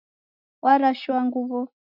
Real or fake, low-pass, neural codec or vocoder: real; 5.4 kHz; none